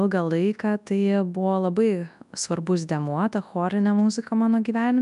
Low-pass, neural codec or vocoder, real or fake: 10.8 kHz; codec, 24 kHz, 0.9 kbps, WavTokenizer, large speech release; fake